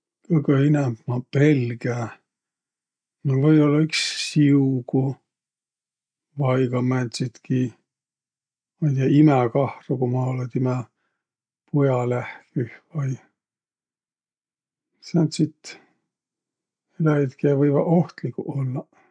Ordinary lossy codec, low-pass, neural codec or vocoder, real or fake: none; 9.9 kHz; none; real